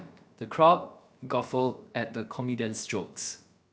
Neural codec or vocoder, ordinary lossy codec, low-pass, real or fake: codec, 16 kHz, about 1 kbps, DyCAST, with the encoder's durations; none; none; fake